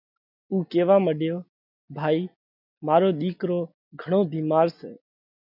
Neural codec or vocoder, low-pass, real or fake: none; 5.4 kHz; real